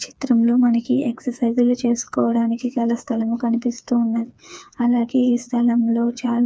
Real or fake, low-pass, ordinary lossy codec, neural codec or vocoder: fake; none; none; codec, 16 kHz, 4 kbps, FreqCodec, smaller model